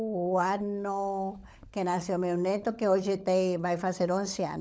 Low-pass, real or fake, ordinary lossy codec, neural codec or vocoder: none; fake; none; codec, 16 kHz, 16 kbps, FunCodec, trained on LibriTTS, 50 frames a second